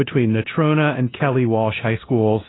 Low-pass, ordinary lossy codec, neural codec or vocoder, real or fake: 7.2 kHz; AAC, 16 kbps; codec, 16 kHz in and 24 kHz out, 0.9 kbps, LongCat-Audio-Codec, four codebook decoder; fake